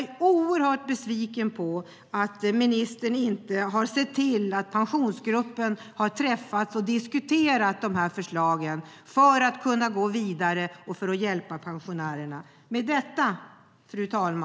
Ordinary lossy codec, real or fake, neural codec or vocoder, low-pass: none; real; none; none